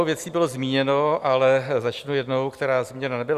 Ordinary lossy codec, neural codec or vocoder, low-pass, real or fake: Opus, 64 kbps; none; 14.4 kHz; real